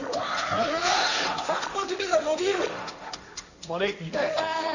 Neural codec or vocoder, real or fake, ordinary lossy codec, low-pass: codec, 16 kHz, 1.1 kbps, Voila-Tokenizer; fake; none; 7.2 kHz